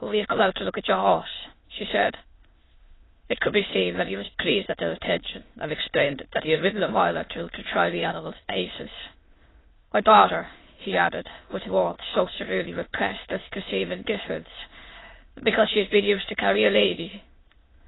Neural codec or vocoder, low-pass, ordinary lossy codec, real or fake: autoencoder, 22.05 kHz, a latent of 192 numbers a frame, VITS, trained on many speakers; 7.2 kHz; AAC, 16 kbps; fake